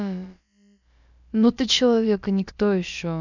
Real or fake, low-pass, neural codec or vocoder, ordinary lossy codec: fake; 7.2 kHz; codec, 16 kHz, about 1 kbps, DyCAST, with the encoder's durations; none